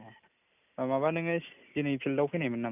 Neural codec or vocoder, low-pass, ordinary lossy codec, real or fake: none; 3.6 kHz; none; real